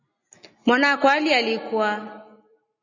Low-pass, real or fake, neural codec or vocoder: 7.2 kHz; real; none